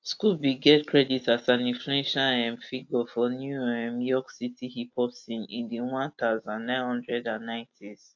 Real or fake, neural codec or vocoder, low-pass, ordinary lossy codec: real; none; 7.2 kHz; AAC, 48 kbps